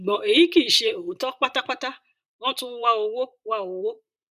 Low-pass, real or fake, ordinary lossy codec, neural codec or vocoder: 14.4 kHz; fake; none; vocoder, 44.1 kHz, 128 mel bands, Pupu-Vocoder